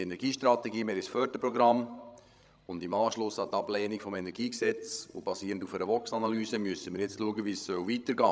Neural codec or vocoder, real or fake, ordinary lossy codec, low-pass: codec, 16 kHz, 16 kbps, FreqCodec, larger model; fake; none; none